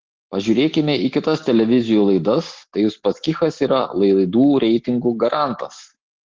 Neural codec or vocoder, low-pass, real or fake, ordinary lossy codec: none; 7.2 kHz; real; Opus, 16 kbps